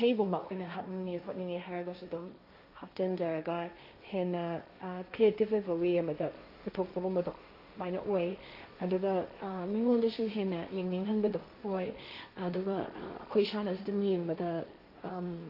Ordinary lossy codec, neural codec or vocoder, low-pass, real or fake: AAC, 32 kbps; codec, 16 kHz, 1.1 kbps, Voila-Tokenizer; 5.4 kHz; fake